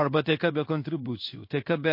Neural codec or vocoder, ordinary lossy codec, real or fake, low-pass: codec, 16 kHz in and 24 kHz out, 1 kbps, XY-Tokenizer; MP3, 32 kbps; fake; 5.4 kHz